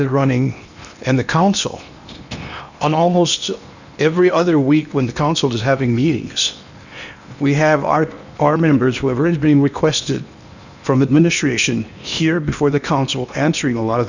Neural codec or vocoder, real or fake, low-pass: codec, 16 kHz in and 24 kHz out, 0.8 kbps, FocalCodec, streaming, 65536 codes; fake; 7.2 kHz